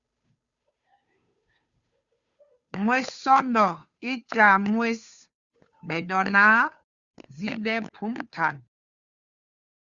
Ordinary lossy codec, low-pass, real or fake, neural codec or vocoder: Opus, 64 kbps; 7.2 kHz; fake; codec, 16 kHz, 2 kbps, FunCodec, trained on Chinese and English, 25 frames a second